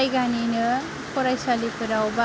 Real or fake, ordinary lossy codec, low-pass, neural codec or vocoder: real; none; none; none